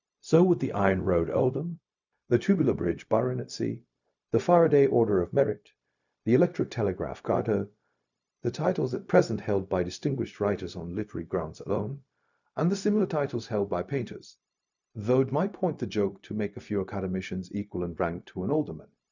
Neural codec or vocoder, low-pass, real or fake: codec, 16 kHz, 0.4 kbps, LongCat-Audio-Codec; 7.2 kHz; fake